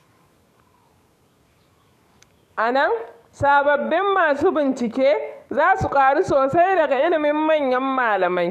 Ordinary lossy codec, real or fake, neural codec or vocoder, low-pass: none; fake; codec, 44.1 kHz, 7.8 kbps, DAC; 14.4 kHz